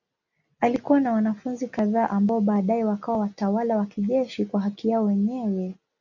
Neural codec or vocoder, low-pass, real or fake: none; 7.2 kHz; real